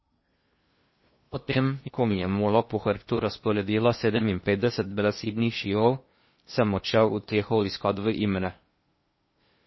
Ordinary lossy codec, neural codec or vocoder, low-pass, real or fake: MP3, 24 kbps; codec, 16 kHz in and 24 kHz out, 0.6 kbps, FocalCodec, streaming, 2048 codes; 7.2 kHz; fake